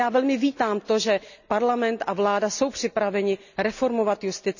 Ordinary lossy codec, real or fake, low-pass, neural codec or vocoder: none; real; 7.2 kHz; none